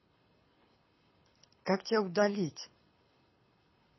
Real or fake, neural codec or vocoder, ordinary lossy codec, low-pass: fake; codec, 24 kHz, 6 kbps, HILCodec; MP3, 24 kbps; 7.2 kHz